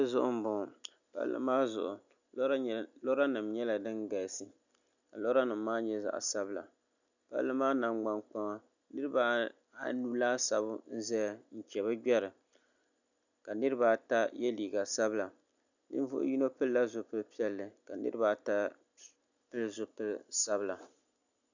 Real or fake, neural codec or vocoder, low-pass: real; none; 7.2 kHz